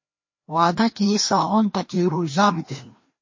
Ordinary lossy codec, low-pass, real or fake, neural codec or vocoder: MP3, 32 kbps; 7.2 kHz; fake; codec, 16 kHz, 1 kbps, FreqCodec, larger model